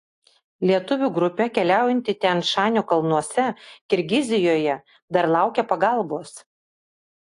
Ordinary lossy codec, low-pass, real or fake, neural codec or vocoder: AAC, 48 kbps; 10.8 kHz; real; none